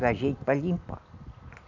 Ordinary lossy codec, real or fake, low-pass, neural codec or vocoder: none; real; 7.2 kHz; none